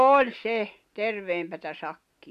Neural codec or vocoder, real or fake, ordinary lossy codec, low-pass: none; real; none; 14.4 kHz